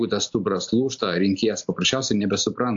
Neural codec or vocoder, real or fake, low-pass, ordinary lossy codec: none; real; 7.2 kHz; AAC, 64 kbps